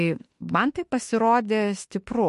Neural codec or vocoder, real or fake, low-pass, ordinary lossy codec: autoencoder, 48 kHz, 32 numbers a frame, DAC-VAE, trained on Japanese speech; fake; 14.4 kHz; MP3, 48 kbps